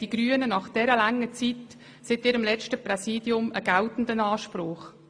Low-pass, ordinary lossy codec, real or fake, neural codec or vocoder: 9.9 kHz; AAC, 64 kbps; real; none